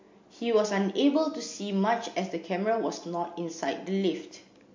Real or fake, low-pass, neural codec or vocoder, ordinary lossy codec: real; 7.2 kHz; none; MP3, 48 kbps